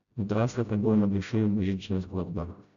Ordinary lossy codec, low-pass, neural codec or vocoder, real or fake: none; 7.2 kHz; codec, 16 kHz, 0.5 kbps, FreqCodec, smaller model; fake